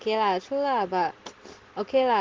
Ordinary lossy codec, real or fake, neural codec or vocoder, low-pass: Opus, 16 kbps; real; none; 7.2 kHz